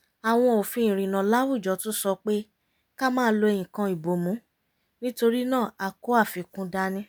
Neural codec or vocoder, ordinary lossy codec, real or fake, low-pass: none; none; real; none